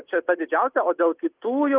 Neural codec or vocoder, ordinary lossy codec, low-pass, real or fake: none; Opus, 24 kbps; 3.6 kHz; real